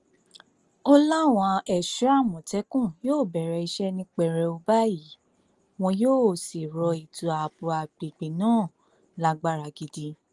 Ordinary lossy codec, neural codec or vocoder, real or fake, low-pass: Opus, 24 kbps; none; real; 10.8 kHz